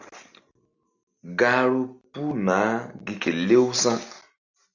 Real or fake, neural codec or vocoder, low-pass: real; none; 7.2 kHz